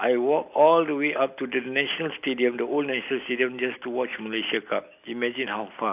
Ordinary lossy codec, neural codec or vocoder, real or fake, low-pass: AAC, 32 kbps; none; real; 3.6 kHz